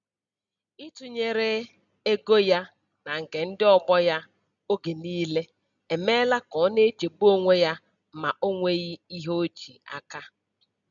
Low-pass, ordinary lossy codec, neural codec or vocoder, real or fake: 7.2 kHz; none; none; real